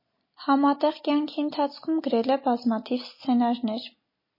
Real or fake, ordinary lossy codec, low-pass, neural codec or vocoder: real; MP3, 24 kbps; 5.4 kHz; none